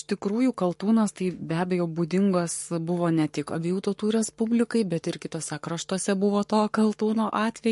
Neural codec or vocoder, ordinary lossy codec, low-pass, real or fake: codec, 44.1 kHz, 7.8 kbps, Pupu-Codec; MP3, 48 kbps; 14.4 kHz; fake